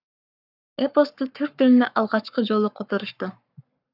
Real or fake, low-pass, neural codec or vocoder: fake; 5.4 kHz; codec, 44.1 kHz, 7.8 kbps, Pupu-Codec